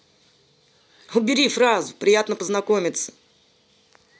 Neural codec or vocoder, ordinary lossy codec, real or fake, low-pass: none; none; real; none